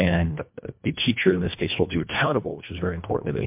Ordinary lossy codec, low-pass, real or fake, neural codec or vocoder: MP3, 32 kbps; 3.6 kHz; fake; codec, 24 kHz, 1.5 kbps, HILCodec